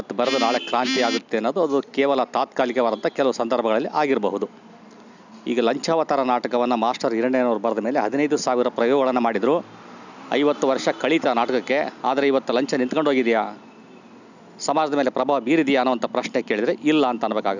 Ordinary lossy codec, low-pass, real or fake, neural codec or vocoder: none; 7.2 kHz; real; none